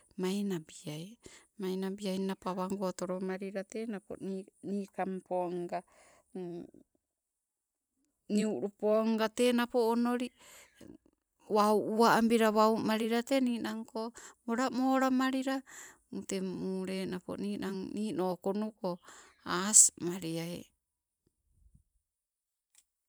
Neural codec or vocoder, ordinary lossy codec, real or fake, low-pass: vocoder, 44.1 kHz, 128 mel bands every 512 samples, BigVGAN v2; none; fake; none